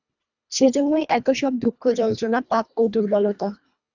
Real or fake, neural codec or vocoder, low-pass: fake; codec, 24 kHz, 1.5 kbps, HILCodec; 7.2 kHz